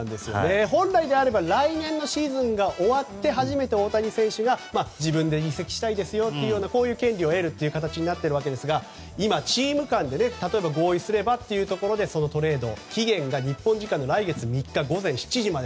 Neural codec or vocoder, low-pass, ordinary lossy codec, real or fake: none; none; none; real